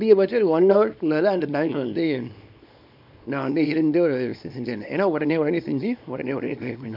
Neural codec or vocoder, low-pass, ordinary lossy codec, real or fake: codec, 24 kHz, 0.9 kbps, WavTokenizer, small release; 5.4 kHz; none; fake